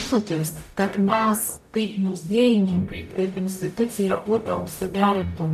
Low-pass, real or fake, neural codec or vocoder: 14.4 kHz; fake; codec, 44.1 kHz, 0.9 kbps, DAC